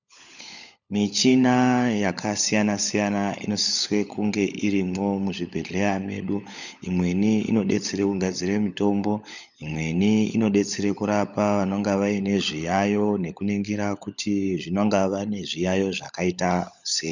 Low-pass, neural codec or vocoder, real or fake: 7.2 kHz; codec, 16 kHz, 16 kbps, FunCodec, trained on LibriTTS, 50 frames a second; fake